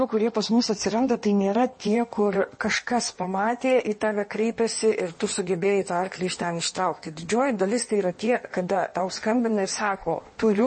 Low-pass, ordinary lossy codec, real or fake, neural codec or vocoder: 9.9 kHz; MP3, 32 kbps; fake; codec, 16 kHz in and 24 kHz out, 1.1 kbps, FireRedTTS-2 codec